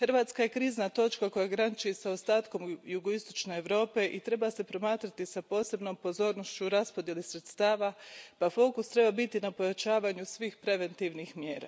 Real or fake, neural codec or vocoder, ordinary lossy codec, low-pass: real; none; none; none